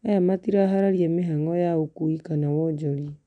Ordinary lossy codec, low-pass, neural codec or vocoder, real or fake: none; 9.9 kHz; none; real